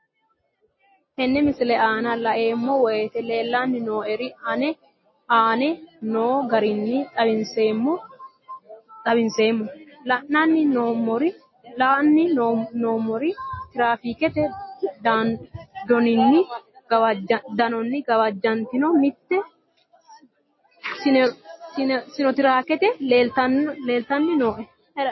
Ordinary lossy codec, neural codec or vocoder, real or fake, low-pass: MP3, 24 kbps; none; real; 7.2 kHz